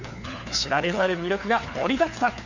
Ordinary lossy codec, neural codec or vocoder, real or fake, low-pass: Opus, 64 kbps; codec, 16 kHz, 4 kbps, FunCodec, trained on LibriTTS, 50 frames a second; fake; 7.2 kHz